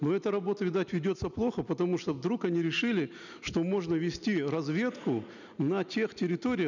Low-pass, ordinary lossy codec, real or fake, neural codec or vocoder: 7.2 kHz; none; real; none